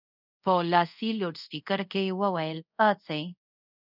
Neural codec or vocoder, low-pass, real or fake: codec, 24 kHz, 0.5 kbps, DualCodec; 5.4 kHz; fake